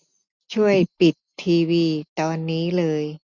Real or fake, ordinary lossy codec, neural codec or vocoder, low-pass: real; none; none; 7.2 kHz